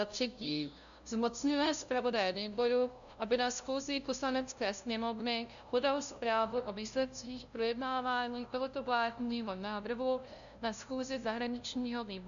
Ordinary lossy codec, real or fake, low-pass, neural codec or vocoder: MP3, 96 kbps; fake; 7.2 kHz; codec, 16 kHz, 0.5 kbps, FunCodec, trained on LibriTTS, 25 frames a second